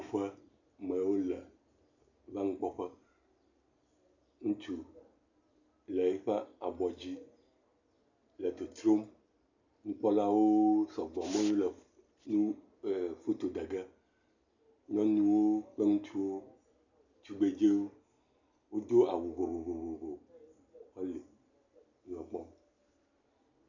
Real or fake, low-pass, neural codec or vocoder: real; 7.2 kHz; none